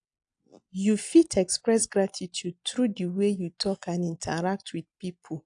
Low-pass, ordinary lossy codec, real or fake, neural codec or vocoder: 9.9 kHz; AAC, 64 kbps; real; none